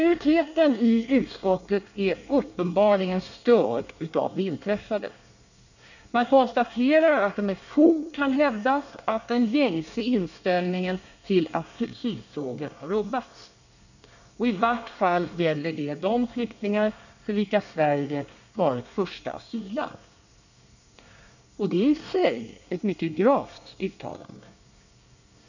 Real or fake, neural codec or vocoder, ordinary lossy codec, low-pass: fake; codec, 24 kHz, 1 kbps, SNAC; none; 7.2 kHz